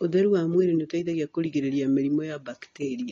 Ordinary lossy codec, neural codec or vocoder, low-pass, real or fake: AAC, 32 kbps; none; 7.2 kHz; real